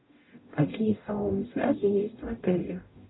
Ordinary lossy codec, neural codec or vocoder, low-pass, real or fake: AAC, 16 kbps; codec, 44.1 kHz, 0.9 kbps, DAC; 7.2 kHz; fake